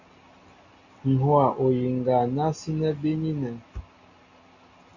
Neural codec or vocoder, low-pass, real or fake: none; 7.2 kHz; real